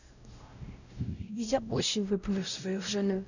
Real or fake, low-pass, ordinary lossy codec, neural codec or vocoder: fake; 7.2 kHz; none; codec, 16 kHz, 0.5 kbps, X-Codec, WavLM features, trained on Multilingual LibriSpeech